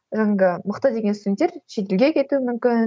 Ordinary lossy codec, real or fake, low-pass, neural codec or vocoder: none; real; none; none